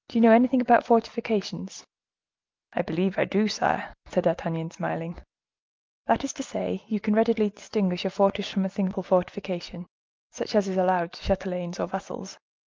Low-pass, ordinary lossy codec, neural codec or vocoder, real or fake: 7.2 kHz; Opus, 32 kbps; none; real